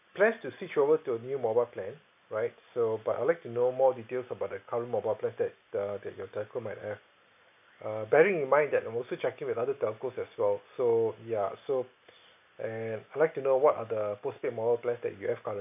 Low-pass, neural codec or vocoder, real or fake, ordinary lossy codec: 3.6 kHz; none; real; AAC, 32 kbps